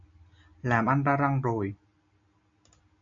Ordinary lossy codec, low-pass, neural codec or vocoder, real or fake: MP3, 64 kbps; 7.2 kHz; none; real